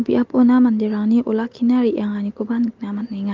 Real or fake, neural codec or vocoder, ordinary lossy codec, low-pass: real; none; Opus, 32 kbps; 7.2 kHz